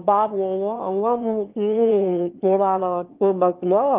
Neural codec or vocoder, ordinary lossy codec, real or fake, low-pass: autoencoder, 22.05 kHz, a latent of 192 numbers a frame, VITS, trained on one speaker; Opus, 32 kbps; fake; 3.6 kHz